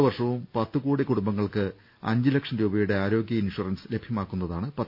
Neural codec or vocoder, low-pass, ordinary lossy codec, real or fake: none; 5.4 kHz; none; real